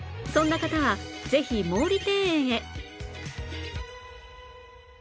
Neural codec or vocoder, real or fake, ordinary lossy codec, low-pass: none; real; none; none